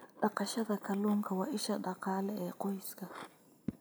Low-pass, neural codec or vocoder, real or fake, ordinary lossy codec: none; none; real; none